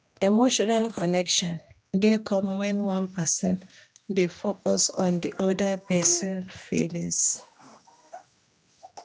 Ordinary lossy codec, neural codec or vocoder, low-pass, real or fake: none; codec, 16 kHz, 1 kbps, X-Codec, HuBERT features, trained on general audio; none; fake